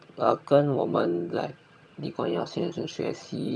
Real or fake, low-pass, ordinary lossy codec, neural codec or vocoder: fake; none; none; vocoder, 22.05 kHz, 80 mel bands, HiFi-GAN